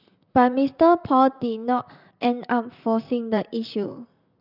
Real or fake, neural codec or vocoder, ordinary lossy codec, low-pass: fake; vocoder, 44.1 kHz, 128 mel bands, Pupu-Vocoder; none; 5.4 kHz